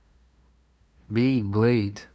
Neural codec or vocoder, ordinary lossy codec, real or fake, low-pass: codec, 16 kHz, 2 kbps, FunCodec, trained on LibriTTS, 25 frames a second; none; fake; none